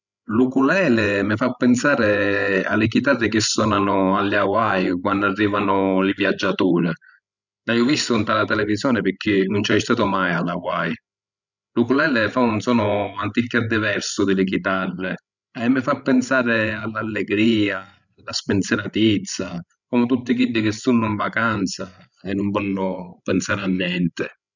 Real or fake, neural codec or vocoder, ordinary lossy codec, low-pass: fake; codec, 16 kHz, 16 kbps, FreqCodec, larger model; none; 7.2 kHz